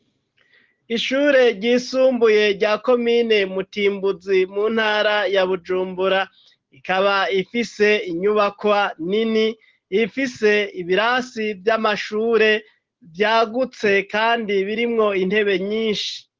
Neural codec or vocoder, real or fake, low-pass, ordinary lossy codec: none; real; 7.2 kHz; Opus, 16 kbps